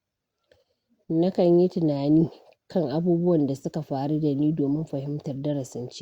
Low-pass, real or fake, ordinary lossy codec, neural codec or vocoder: 19.8 kHz; real; Opus, 64 kbps; none